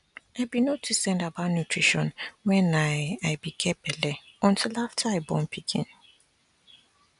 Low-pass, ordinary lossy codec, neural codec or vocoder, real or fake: 10.8 kHz; none; none; real